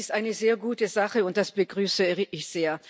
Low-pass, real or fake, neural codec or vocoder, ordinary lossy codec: none; real; none; none